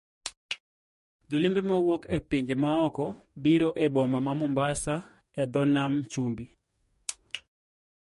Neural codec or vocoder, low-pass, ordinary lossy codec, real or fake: codec, 44.1 kHz, 2.6 kbps, DAC; 14.4 kHz; MP3, 48 kbps; fake